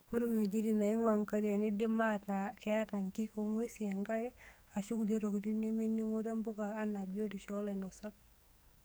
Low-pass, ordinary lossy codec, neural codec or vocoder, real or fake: none; none; codec, 44.1 kHz, 2.6 kbps, SNAC; fake